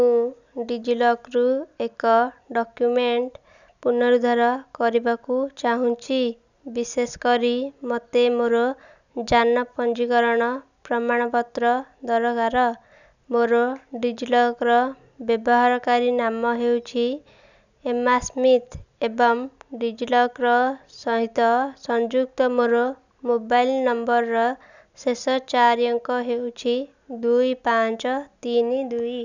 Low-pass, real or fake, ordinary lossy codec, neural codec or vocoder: 7.2 kHz; real; none; none